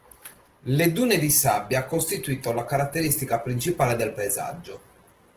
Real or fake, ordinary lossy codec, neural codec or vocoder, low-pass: real; Opus, 16 kbps; none; 14.4 kHz